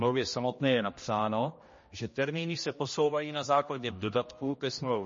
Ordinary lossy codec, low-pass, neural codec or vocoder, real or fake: MP3, 32 kbps; 7.2 kHz; codec, 16 kHz, 1 kbps, X-Codec, HuBERT features, trained on general audio; fake